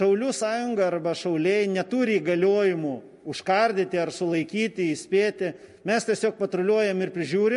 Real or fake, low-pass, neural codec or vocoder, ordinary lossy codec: real; 14.4 kHz; none; MP3, 48 kbps